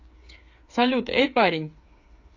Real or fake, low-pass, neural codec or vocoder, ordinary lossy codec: fake; 7.2 kHz; codec, 16 kHz, 8 kbps, FreqCodec, smaller model; AAC, 48 kbps